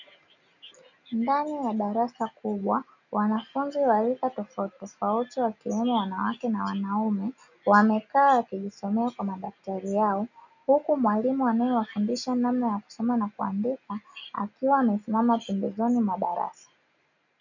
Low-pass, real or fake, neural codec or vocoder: 7.2 kHz; real; none